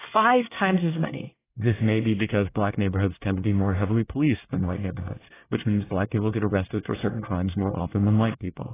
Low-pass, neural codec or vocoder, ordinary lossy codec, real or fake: 3.6 kHz; codec, 24 kHz, 1 kbps, SNAC; AAC, 16 kbps; fake